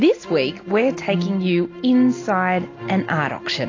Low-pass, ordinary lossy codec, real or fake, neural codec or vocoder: 7.2 kHz; AAC, 32 kbps; real; none